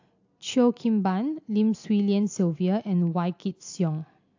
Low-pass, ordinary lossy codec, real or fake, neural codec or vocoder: 7.2 kHz; AAC, 48 kbps; real; none